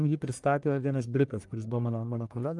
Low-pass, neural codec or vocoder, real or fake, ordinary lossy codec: 10.8 kHz; codec, 44.1 kHz, 1.7 kbps, Pupu-Codec; fake; Opus, 32 kbps